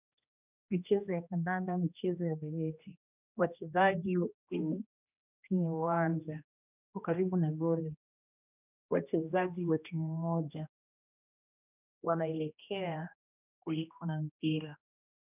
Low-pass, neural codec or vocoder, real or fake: 3.6 kHz; codec, 16 kHz, 1 kbps, X-Codec, HuBERT features, trained on general audio; fake